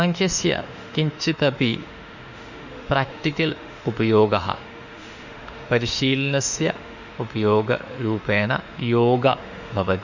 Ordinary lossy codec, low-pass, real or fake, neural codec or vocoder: Opus, 64 kbps; 7.2 kHz; fake; autoencoder, 48 kHz, 32 numbers a frame, DAC-VAE, trained on Japanese speech